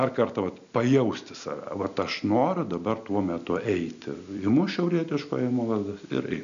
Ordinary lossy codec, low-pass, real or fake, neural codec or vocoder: MP3, 96 kbps; 7.2 kHz; real; none